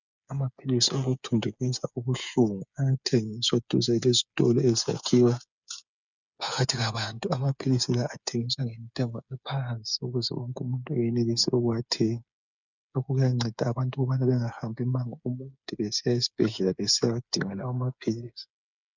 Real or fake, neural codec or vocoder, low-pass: fake; codec, 16 kHz, 8 kbps, FreqCodec, smaller model; 7.2 kHz